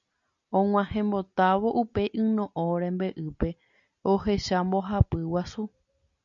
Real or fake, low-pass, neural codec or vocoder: real; 7.2 kHz; none